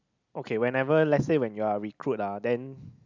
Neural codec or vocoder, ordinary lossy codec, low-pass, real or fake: none; none; 7.2 kHz; real